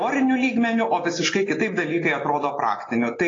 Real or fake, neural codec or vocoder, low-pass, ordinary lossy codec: real; none; 7.2 kHz; AAC, 32 kbps